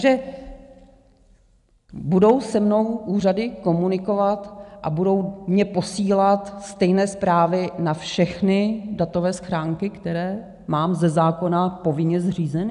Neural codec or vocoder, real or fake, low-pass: none; real; 10.8 kHz